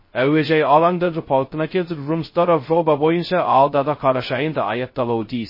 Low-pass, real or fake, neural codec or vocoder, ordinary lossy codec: 5.4 kHz; fake; codec, 16 kHz, 0.2 kbps, FocalCodec; MP3, 24 kbps